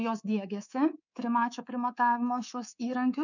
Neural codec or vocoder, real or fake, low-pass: codec, 24 kHz, 3.1 kbps, DualCodec; fake; 7.2 kHz